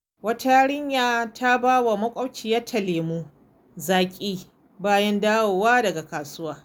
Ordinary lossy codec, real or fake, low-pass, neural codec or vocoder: none; real; none; none